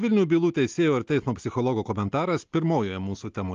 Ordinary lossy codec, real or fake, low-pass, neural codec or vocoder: Opus, 32 kbps; real; 7.2 kHz; none